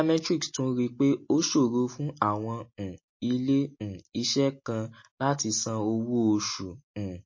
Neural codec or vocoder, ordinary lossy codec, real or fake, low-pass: none; MP3, 32 kbps; real; 7.2 kHz